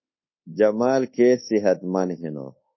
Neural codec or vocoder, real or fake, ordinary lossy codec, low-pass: codec, 24 kHz, 1.2 kbps, DualCodec; fake; MP3, 24 kbps; 7.2 kHz